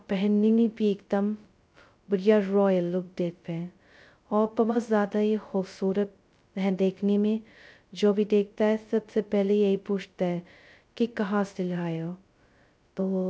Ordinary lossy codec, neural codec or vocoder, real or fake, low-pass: none; codec, 16 kHz, 0.2 kbps, FocalCodec; fake; none